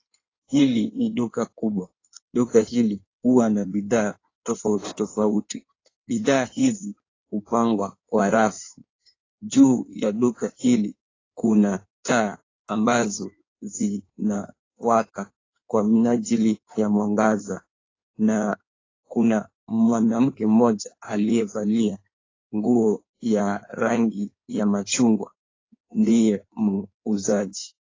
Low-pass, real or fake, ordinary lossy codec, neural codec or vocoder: 7.2 kHz; fake; AAC, 32 kbps; codec, 16 kHz in and 24 kHz out, 1.1 kbps, FireRedTTS-2 codec